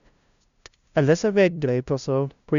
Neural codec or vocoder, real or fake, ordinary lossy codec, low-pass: codec, 16 kHz, 0.5 kbps, FunCodec, trained on LibriTTS, 25 frames a second; fake; none; 7.2 kHz